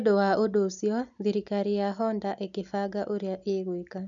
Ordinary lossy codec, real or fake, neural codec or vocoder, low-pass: none; real; none; 7.2 kHz